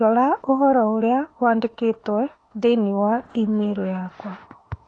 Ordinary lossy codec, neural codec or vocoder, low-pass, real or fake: AAC, 32 kbps; autoencoder, 48 kHz, 32 numbers a frame, DAC-VAE, trained on Japanese speech; 9.9 kHz; fake